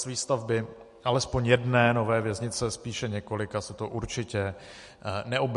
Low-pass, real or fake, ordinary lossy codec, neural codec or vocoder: 14.4 kHz; real; MP3, 48 kbps; none